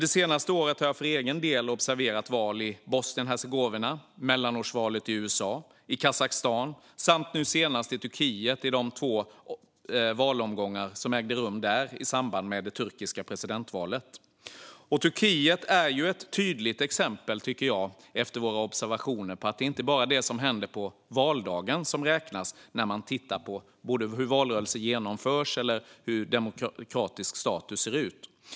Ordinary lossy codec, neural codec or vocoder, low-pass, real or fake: none; none; none; real